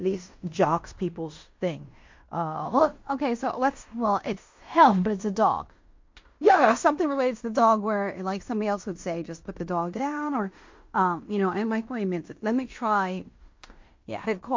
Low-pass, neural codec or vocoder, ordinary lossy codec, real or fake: 7.2 kHz; codec, 16 kHz in and 24 kHz out, 0.9 kbps, LongCat-Audio-Codec, fine tuned four codebook decoder; MP3, 48 kbps; fake